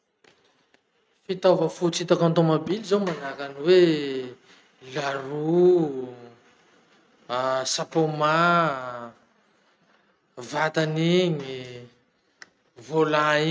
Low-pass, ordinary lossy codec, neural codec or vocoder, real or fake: none; none; none; real